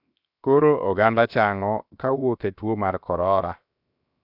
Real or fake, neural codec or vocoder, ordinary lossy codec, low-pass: fake; codec, 16 kHz, 0.7 kbps, FocalCodec; none; 5.4 kHz